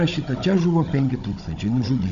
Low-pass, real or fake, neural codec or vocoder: 7.2 kHz; fake; codec, 16 kHz, 16 kbps, FunCodec, trained on Chinese and English, 50 frames a second